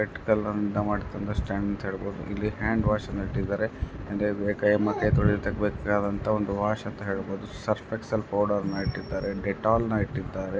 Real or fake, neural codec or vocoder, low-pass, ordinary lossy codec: real; none; none; none